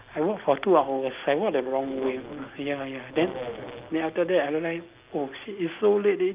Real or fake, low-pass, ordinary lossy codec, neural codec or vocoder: real; 3.6 kHz; Opus, 32 kbps; none